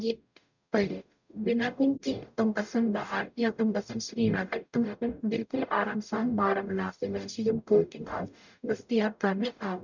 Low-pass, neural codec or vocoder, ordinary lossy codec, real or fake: 7.2 kHz; codec, 44.1 kHz, 0.9 kbps, DAC; none; fake